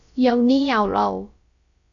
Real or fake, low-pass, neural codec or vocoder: fake; 7.2 kHz; codec, 16 kHz, about 1 kbps, DyCAST, with the encoder's durations